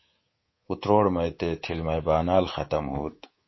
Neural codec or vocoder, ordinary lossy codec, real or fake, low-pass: codec, 24 kHz, 3.1 kbps, DualCodec; MP3, 24 kbps; fake; 7.2 kHz